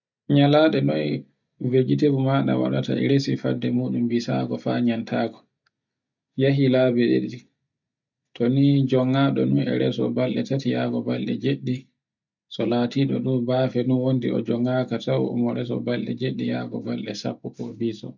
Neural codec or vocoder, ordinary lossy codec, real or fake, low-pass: none; none; real; 7.2 kHz